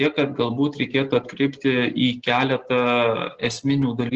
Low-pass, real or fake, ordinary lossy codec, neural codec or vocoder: 7.2 kHz; real; Opus, 16 kbps; none